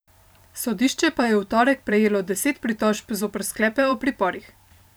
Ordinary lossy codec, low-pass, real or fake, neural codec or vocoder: none; none; fake; vocoder, 44.1 kHz, 128 mel bands every 512 samples, BigVGAN v2